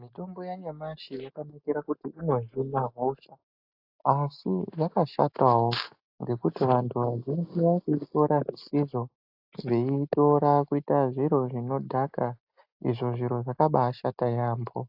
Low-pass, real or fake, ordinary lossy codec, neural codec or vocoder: 5.4 kHz; real; AAC, 48 kbps; none